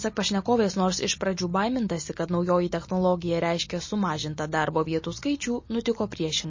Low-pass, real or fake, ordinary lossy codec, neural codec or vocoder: 7.2 kHz; real; MP3, 32 kbps; none